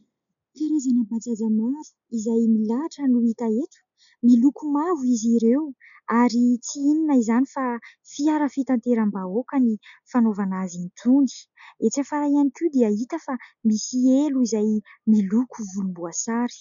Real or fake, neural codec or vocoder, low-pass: real; none; 7.2 kHz